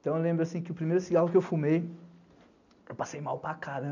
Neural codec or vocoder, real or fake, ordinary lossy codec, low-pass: none; real; none; 7.2 kHz